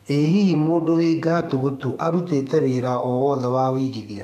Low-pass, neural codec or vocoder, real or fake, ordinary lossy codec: 14.4 kHz; codec, 32 kHz, 1.9 kbps, SNAC; fake; none